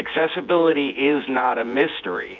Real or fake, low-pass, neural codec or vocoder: fake; 7.2 kHz; vocoder, 24 kHz, 100 mel bands, Vocos